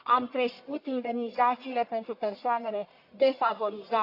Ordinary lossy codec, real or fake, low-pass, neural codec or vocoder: AAC, 32 kbps; fake; 5.4 kHz; codec, 44.1 kHz, 1.7 kbps, Pupu-Codec